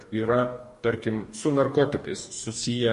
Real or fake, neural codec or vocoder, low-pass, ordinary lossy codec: fake; codec, 44.1 kHz, 2.6 kbps, DAC; 14.4 kHz; MP3, 48 kbps